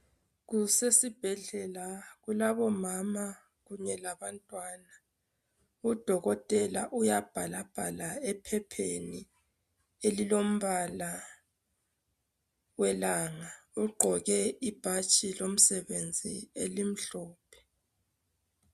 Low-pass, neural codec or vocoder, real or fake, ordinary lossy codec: 14.4 kHz; none; real; MP3, 96 kbps